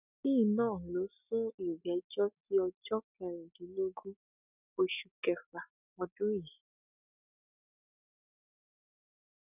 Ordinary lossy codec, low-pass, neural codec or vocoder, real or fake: none; 3.6 kHz; none; real